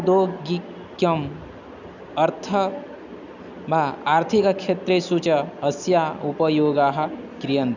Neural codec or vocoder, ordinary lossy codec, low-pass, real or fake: none; none; 7.2 kHz; real